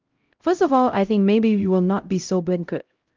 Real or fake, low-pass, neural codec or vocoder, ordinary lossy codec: fake; 7.2 kHz; codec, 16 kHz, 0.5 kbps, X-Codec, HuBERT features, trained on LibriSpeech; Opus, 32 kbps